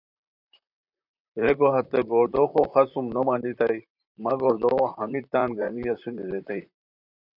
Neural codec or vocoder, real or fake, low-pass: vocoder, 44.1 kHz, 128 mel bands, Pupu-Vocoder; fake; 5.4 kHz